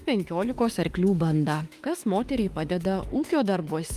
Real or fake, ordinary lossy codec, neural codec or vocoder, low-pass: fake; Opus, 32 kbps; autoencoder, 48 kHz, 32 numbers a frame, DAC-VAE, trained on Japanese speech; 14.4 kHz